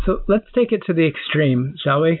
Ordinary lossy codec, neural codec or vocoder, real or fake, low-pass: AAC, 48 kbps; none; real; 5.4 kHz